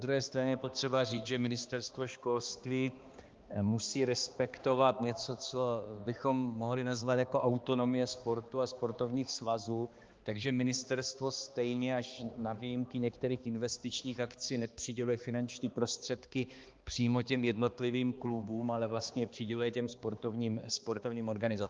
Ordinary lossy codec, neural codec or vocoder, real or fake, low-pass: Opus, 32 kbps; codec, 16 kHz, 2 kbps, X-Codec, HuBERT features, trained on balanced general audio; fake; 7.2 kHz